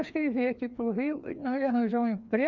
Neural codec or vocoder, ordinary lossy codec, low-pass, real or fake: codec, 16 kHz, 2 kbps, FreqCodec, larger model; none; 7.2 kHz; fake